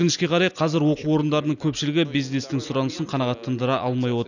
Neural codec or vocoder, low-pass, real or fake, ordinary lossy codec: none; 7.2 kHz; real; none